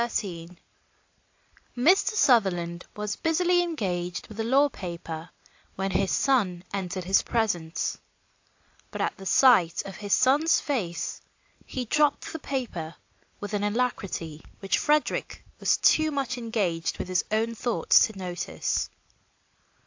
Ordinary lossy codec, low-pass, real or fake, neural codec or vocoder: AAC, 48 kbps; 7.2 kHz; real; none